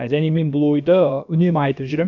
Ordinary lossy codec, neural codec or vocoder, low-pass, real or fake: none; codec, 16 kHz, about 1 kbps, DyCAST, with the encoder's durations; 7.2 kHz; fake